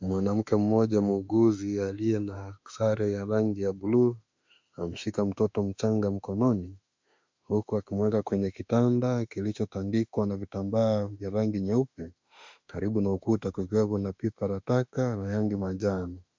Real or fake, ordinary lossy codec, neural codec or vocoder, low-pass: fake; MP3, 64 kbps; autoencoder, 48 kHz, 32 numbers a frame, DAC-VAE, trained on Japanese speech; 7.2 kHz